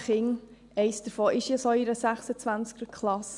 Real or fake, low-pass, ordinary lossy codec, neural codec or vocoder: real; 10.8 kHz; MP3, 96 kbps; none